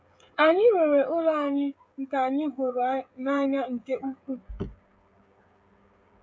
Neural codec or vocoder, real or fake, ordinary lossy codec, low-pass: codec, 16 kHz, 16 kbps, FreqCodec, smaller model; fake; none; none